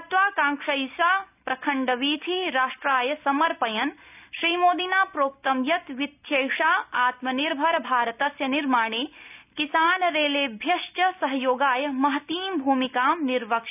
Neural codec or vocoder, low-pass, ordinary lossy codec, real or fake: none; 3.6 kHz; none; real